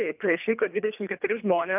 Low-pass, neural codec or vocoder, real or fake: 3.6 kHz; codec, 24 kHz, 3 kbps, HILCodec; fake